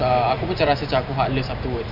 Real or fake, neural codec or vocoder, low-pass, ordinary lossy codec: fake; vocoder, 44.1 kHz, 128 mel bands every 512 samples, BigVGAN v2; 5.4 kHz; none